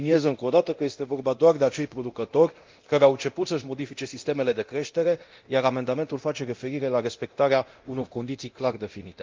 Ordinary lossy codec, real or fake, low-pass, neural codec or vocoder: Opus, 24 kbps; fake; 7.2 kHz; codec, 24 kHz, 0.9 kbps, DualCodec